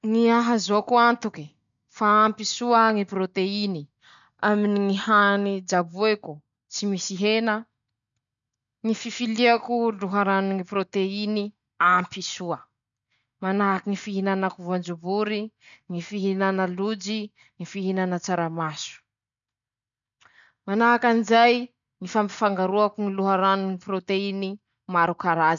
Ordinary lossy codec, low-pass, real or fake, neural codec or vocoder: none; 7.2 kHz; real; none